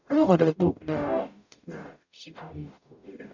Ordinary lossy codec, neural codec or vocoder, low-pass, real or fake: none; codec, 44.1 kHz, 0.9 kbps, DAC; 7.2 kHz; fake